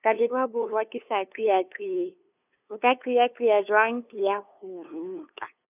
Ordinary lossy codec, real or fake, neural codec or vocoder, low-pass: none; fake; codec, 16 kHz, 2 kbps, FunCodec, trained on LibriTTS, 25 frames a second; 3.6 kHz